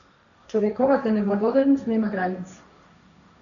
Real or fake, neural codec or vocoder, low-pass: fake; codec, 16 kHz, 1.1 kbps, Voila-Tokenizer; 7.2 kHz